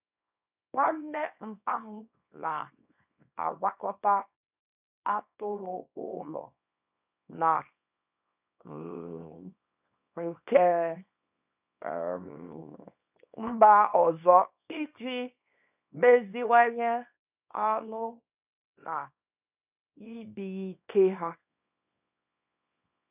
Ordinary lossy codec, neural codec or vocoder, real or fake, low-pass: none; codec, 24 kHz, 0.9 kbps, WavTokenizer, small release; fake; 3.6 kHz